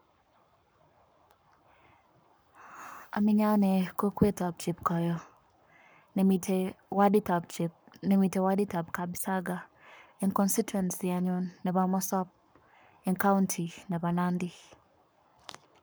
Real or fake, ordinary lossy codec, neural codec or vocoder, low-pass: fake; none; codec, 44.1 kHz, 7.8 kbps, Pupu-Codec; none